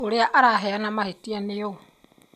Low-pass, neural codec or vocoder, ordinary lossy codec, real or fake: 14.4 kHz; none; none; real